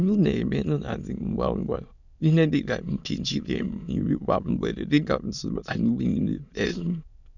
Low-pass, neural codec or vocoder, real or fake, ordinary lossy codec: 7.2 kHz; autoencoder, 22.05 kHz, a latent of 192 numbers a frame, VITS, trained on many speakers; fake; none